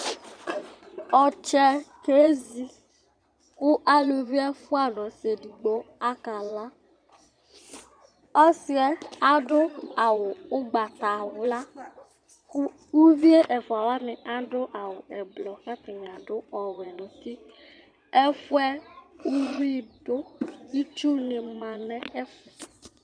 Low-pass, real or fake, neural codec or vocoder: 9.9 kHz; fake; vocoder, 22.05 kHz, 80 mel bands, Vocos